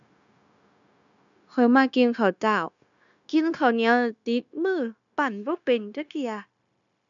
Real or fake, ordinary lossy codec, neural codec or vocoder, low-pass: fake; none; codec, 16 kHz, 0.9 kbps, LongCat-Audio-Codec; 7.2 kHz